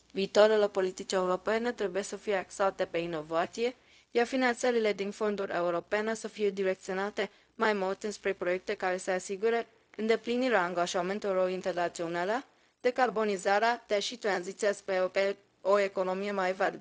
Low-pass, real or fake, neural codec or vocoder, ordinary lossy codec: none; fake; codec, 16 kHz, 0.4 kbps, LongCat-Audio-Codec; none